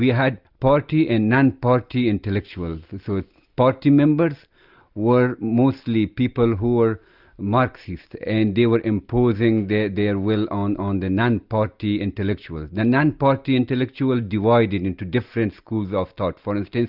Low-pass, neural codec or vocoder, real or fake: 5.4 kHz; none; real